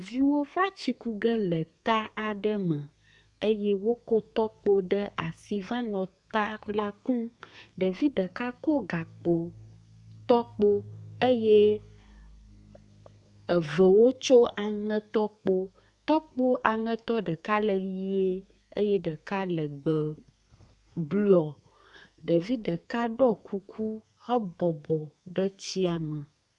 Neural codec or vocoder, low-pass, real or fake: codec, 32 kHz, 1.9 kbps, SNAC; 10.8 kHz; fake